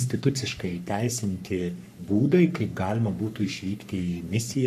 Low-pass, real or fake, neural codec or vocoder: 14.4 kHz; fake; codec, 44.1 kHz, 3.4 kbps, Pupu-Codec